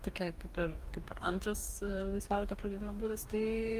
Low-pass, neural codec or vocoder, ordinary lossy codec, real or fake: 14.4 kHz; codec, 44.1 kHz, 2.6 kbps, DAC; Opus, 32 kbps; fake